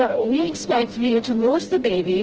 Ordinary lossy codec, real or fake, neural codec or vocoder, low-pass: Opus, 16 kbps; fake; codec, 16 kHz, 0.5 kbps, FreqCodec, smaller model; 7.2 kHz